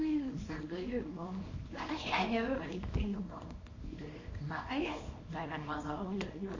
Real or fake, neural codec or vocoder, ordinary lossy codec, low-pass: fake; codec, 24 kHz, 0.9 kbps, WavTokenizer, small release; MP3, 32 kbps; 7.2 kHz